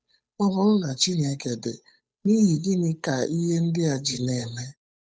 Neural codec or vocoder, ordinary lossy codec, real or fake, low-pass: codec, 16 kHz, 8 kbps, FunCodec, trained on Chinese and English, 25 frames a second; none; fake; none